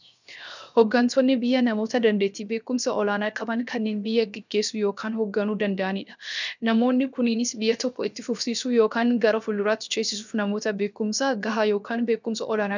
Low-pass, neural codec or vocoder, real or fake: 7.2 kHz; codec, 16 kHz, 0.7 kbps, FocalCodec; fake